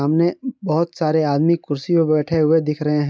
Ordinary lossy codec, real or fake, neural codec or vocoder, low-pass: none; real; none; none